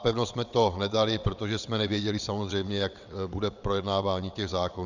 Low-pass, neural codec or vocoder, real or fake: 7.2 kHz; vocoder, 22.05 kHz, 80 mel bands, WaveNeXt; fake